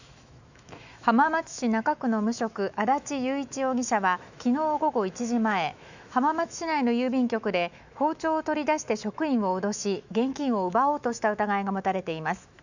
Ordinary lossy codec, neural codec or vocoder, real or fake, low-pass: none; autoencoder, 48 kHz, 128 numbers a frame, DAC-VAE, trained on Japanese speech; fake; 7.2 kHz